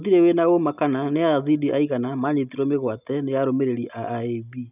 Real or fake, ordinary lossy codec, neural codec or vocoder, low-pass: real; none; none; 3.6 kHz